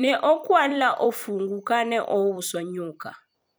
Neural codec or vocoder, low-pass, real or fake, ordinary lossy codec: vocoder, 44.1 kHz, 128 mel bands every 256 samples, BigVGAN v2; none; fake; none